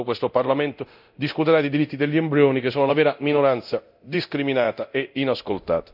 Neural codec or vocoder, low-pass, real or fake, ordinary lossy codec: codec, 24 kHz, 0.9 kbps, DualCodec; 5.4 kHz; fake; none